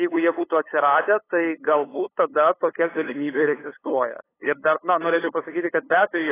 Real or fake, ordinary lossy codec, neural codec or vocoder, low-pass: fake; AAC, 16 kbps; codec, 16 kHz, 8 kbps, FunCodec, trained on LibriTTS, 25 frames a second; 3.6 kHz